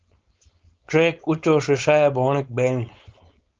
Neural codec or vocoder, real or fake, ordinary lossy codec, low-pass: codec, 16 kHz, 4.8 kbps, FACodec; fake; Opus, 24 kbps; 7.2 kHz